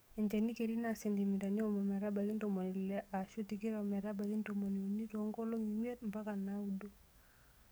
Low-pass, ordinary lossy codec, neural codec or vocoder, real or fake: none; none; codec, 44.1 kHz, 7.8 kbps, DAC; fake